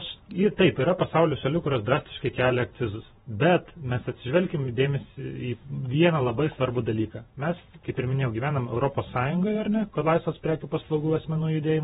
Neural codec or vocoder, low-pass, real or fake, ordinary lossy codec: vocoder, 48 kHz, 128 mel bands, Vocos; 19.8 kHz; fake; AAC, 16 kbps